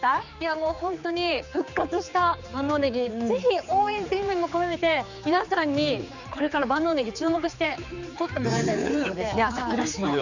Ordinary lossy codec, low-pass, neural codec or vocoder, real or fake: none; 7.2 kHz; codec, 16 kHz, 4 kbps, X-Codec, HuBERT features, trained on general audio; fake